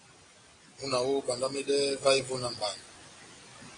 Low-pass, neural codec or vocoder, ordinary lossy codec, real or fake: 9.9 kHz; none; AAC, 32 kbps; real